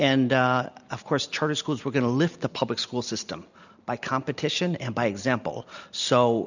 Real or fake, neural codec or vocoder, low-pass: real; none; 7.2 kHz